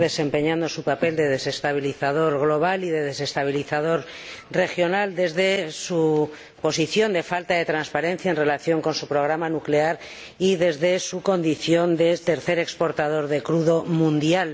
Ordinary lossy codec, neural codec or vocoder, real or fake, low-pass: none; none; real; none